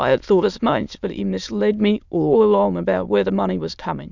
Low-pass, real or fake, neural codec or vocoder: 7.2 kHz; fake; autoencoder, 22.05 kHz, a latent of 192 numbers a frame, VITS, trained on many speakers